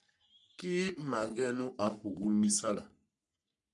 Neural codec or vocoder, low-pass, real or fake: codec, 44.1 kHz, 3.4 kbps, Pupu-Codec; 10.8 kHz; fake